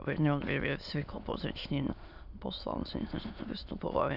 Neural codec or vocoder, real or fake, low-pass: autoencoder, 22.05 kHz, a latent of 192 numbers a frame, VITS, trained on many speakers; fake; 5.4 kHz